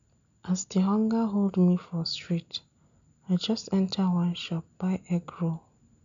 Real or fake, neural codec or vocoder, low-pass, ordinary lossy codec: real; none; 7.2 kHz; none